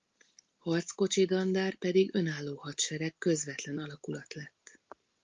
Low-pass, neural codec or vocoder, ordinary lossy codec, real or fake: 7.2 kHz; none; Opus, 32 kbps; real